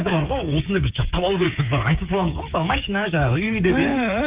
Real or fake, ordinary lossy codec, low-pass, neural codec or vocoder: fake; Opus, 24 kbps; 3.6 kHz; codec, 16 kHz, 8 kbps, FreqCodec, smaller model